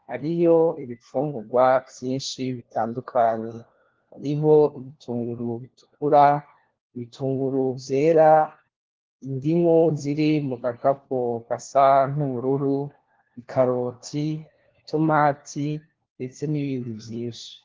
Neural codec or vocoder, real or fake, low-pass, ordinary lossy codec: codec, 16 kHz, 1 kbps, FunCodec, trained on LibriTTS, 50 frames a second; fake; 7.2 kHz; Opus, 16 kbps